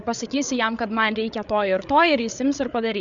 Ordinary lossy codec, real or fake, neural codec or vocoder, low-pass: Opus, 64 kbps; fake; codec, 16 kHz, 8 kbps, FreqCodec, larger model; 7.2 kHz